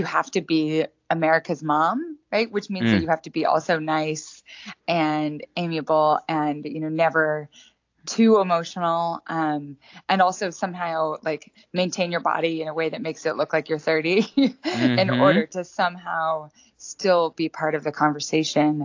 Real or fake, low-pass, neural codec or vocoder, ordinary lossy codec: real; 7.2 kHz; none; AAC, 48 kbps